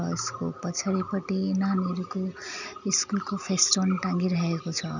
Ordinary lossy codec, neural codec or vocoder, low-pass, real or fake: none; none; 7.2 kHz; real